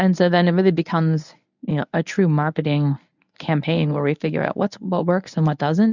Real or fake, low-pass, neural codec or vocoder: fake; 7.2 kHz; codec, 24 kHz, 0.9 kbps, WavTokenizer, medium speech release version 1